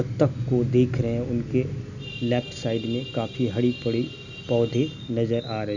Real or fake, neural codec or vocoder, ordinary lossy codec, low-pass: real; none; none; 7.2 kHz